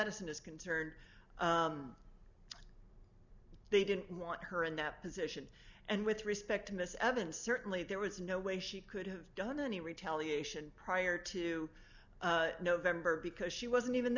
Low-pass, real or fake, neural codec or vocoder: 7.2 kHz; real; none